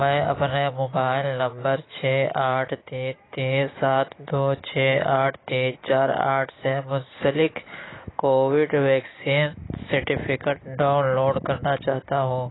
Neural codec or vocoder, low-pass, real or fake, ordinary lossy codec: none; 7.2 kHz; real; AAC, 16 kbps